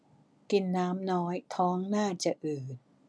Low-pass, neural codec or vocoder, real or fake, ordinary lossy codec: none; none; real; none